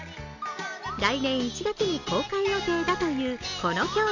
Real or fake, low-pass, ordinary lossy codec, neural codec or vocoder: real; 7.2 kHz; none; none